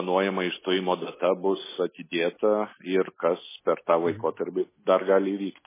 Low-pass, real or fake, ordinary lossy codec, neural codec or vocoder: 3.6 kHz; real; MP3, 16 kbps; none